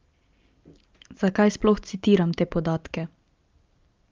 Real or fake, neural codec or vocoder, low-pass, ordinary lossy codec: real; none; 7.2 kHz; Opus, 32 kbps